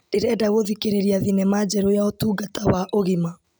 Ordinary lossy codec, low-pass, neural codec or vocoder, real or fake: none; none; none; real